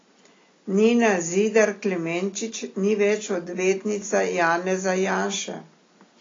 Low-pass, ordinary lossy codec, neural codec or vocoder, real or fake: 7.2 kHz; AAC, 32 kbps; none; real